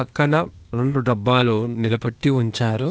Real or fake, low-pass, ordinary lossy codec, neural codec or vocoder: fake; none; none; codec, 16 kHz, 0.8 kbps, ZipCodec